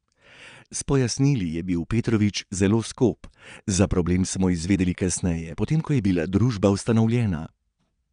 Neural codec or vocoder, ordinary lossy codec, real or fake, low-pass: vocoder, 22.05 kHz, 80 mel bands, Vocos; none; fake; 9.9 kHz